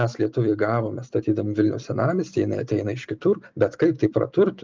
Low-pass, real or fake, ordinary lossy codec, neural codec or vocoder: 7.2 kHz; real; Opus, 24 kbps; none